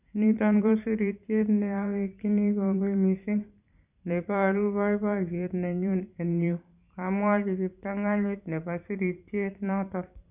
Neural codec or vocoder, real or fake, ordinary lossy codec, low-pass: vocoder, 44.1 kHz, 128 mel bands every 512 samples, BigVGAN v2; fake; none; 3.6 kHz